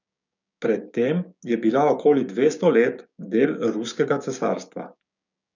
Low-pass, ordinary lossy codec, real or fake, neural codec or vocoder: 7.2 kHz; none; fake; codec, 16 kHz, 6 kbps, DAC